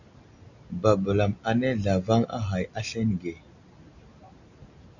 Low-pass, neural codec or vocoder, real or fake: 7.2 kHz; none; real